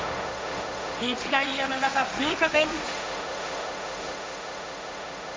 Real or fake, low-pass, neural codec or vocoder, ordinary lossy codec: fake; none; codec, 16 kHz, 1.1 kbps, Voila-Tokenizer; none